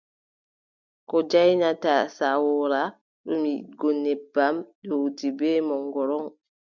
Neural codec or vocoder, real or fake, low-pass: none; real; 7.2 kHz